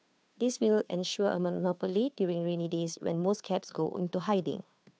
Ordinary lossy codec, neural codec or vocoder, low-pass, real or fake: none; codec, 16 kHz, 2 kbps, FunCodec, trained on Chinese and English, 25 frames a second; none; fake